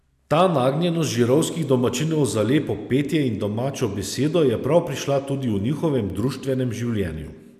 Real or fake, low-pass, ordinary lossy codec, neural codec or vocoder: real; 14.4 kHz; none; none